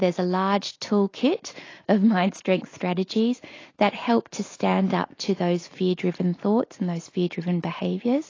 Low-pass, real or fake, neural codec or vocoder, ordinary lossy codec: 7.2 kHz; real; none; AAC, 32 kbps